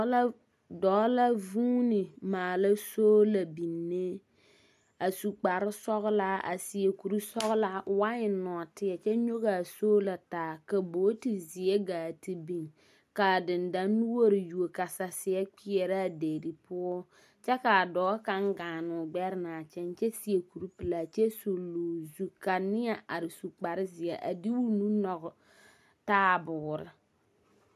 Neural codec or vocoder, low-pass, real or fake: none; 14.4 kHz; real